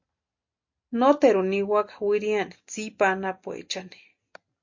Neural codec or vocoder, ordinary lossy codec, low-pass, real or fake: none; MP3, 48 kbps; 7.2 kHz; real